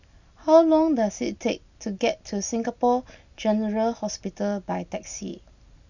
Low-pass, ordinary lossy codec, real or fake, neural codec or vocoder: 7.2 kHz; none; real; none